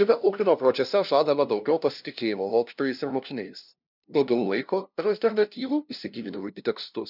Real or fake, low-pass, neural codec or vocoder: fake; 5.4 kHz; codec, 16 kHz, 0.5 kbps, FunCodec, trained on LibriTTS, 25 frames a second